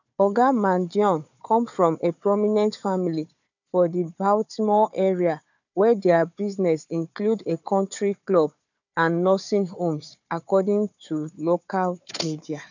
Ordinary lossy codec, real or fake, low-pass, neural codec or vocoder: none; fake; 7.2 kHz; codec, 16 kHz, 4 kbps, FunCodec, trained on Chinese and English, 50 frames a second